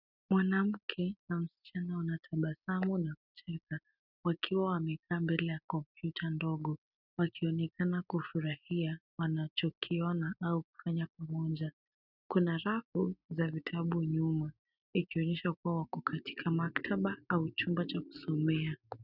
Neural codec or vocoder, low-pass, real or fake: none; 5.4 kHz; real